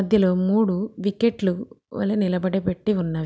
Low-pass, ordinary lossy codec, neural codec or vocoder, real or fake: none; none; none; real